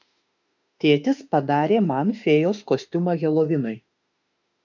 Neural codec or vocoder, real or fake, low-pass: autoencoder, 48 kHz, 32 numbers a frame, DAC-VAE, trained on Japanese speech; fake; 7.2 kHz